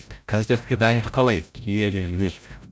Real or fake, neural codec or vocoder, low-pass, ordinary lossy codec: fake; codec, 16 kHz, 0.5 kbps, FreqCodec, larger model; none; none